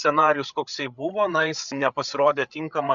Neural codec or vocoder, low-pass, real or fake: codec, 16 kHz, 8 kbps, FreqCodec, larger model; 7.2 kHz; fake